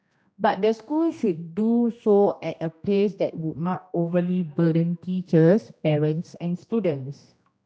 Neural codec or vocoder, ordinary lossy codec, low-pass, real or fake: codec, 16 kHz, 1 kbps, X-Codec, HuBERT features, trained on general audio; none; none; fake